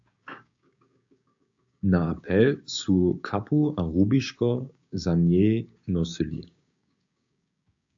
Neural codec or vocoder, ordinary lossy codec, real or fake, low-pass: codec, 16 kHz, 6 kbps, DAC; AAC, 64 kbps; fake; 7.2 kHz